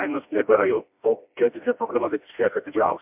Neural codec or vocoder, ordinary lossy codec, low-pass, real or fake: codec, 16 kHz, 1 kbps, FreqCodec, smaller model; MP3, 32 kbps; 3.6 kHz; fake